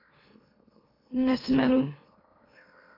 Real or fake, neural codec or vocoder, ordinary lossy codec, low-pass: fake; autoencoder, 44.1 kHz, a latent of 192 numbers a frame, MeloTTS; AAC, 24 kbps; 5.4 kHz